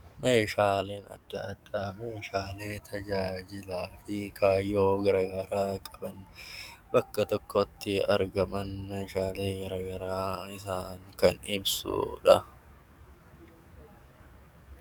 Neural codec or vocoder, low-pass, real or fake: codec, 44.1 kHz, 7.8 kbps, DAC; 19.8 kHz; fake